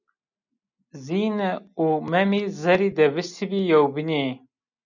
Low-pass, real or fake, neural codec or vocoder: 7.2 kHz; real; none